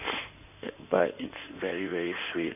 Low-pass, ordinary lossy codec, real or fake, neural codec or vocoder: 3.6 kHz; none; fake; codec, 16 kHz in and 24 kHz out, 2.2 kbps, FireRedTTS-2 codec